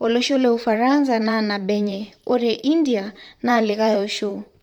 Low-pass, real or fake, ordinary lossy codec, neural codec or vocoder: 19.8 kHz; fake; none; vocoder, 44.1 kHz, 128 mel bands, Pupu-Vocoder